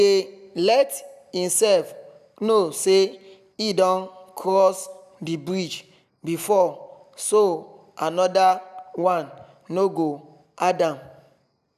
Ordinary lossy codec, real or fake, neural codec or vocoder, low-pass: none; real; none; 14.4 kHz